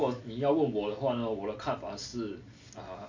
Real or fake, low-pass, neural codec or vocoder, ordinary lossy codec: real; 7.2 kHz; none; MP3, 48 kbps